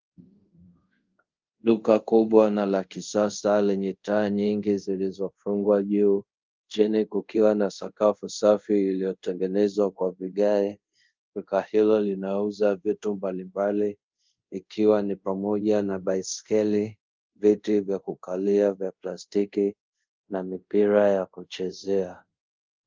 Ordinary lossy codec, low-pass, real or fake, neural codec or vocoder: Opus, 32 kbps; 7.2 kHz; fake; codec, 24 kHz, 0.5 kbps, DualCodec